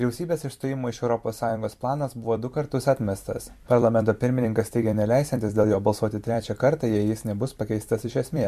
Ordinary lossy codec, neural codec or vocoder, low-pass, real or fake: MP3, 64 kbps; vocoder, 44.1 kHz, 128 mel bands every 256 samples, BigVGAN v2; 14.4 kHz; fake